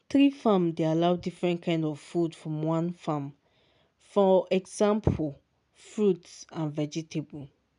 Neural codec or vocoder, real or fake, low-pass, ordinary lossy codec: none; real; 10.8 kHz; none